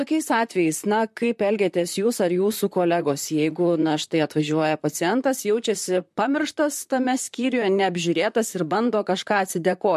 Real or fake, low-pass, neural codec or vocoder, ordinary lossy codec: fake; 14.4 kHz; vocoder, 44.1 kHz, 128 mel bands, Pupu-Vocoder; MP3, 64 kbps